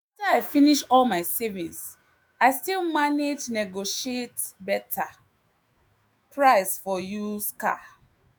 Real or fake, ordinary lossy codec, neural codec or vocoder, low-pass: fake; none; autoencoder, 48 kHz, 128 numbers a frame, DAC-VAE, trained on Japanese speech; none